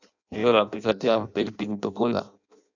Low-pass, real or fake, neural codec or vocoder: 7.2 kHz; fake; codec, 16 kHz in and 24 kHz out, 0.6 kbps, FireRedTTS-2 codec